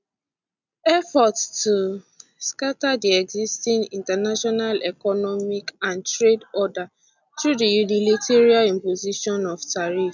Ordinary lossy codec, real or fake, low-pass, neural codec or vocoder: none; real; 7.2 kHz; none